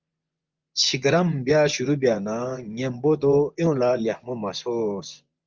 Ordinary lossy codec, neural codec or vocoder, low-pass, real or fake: Opus, 24 kbps; vocoder, 24 kHz, 100 mel bands, Vocos; 7.2 kHz; fake